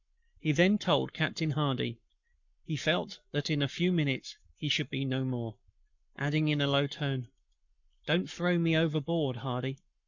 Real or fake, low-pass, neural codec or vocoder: fake; 7.2 kHz; codec, 44.1 kHz, 7.8 kbps, Pupu-Codec